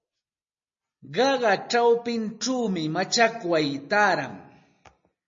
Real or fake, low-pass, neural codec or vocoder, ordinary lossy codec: real; 7.2 kHz; none; MP3, 32 kbps